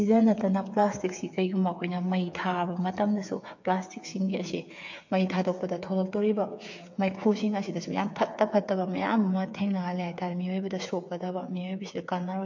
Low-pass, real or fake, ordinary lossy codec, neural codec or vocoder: 7.2 kHz; fake; MP3, 48 kbps; codec, 16 kHz, 8 kbps, FreqCodec, smaller model